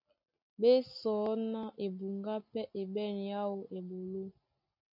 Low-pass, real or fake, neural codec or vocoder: 5.4 kHz; real; none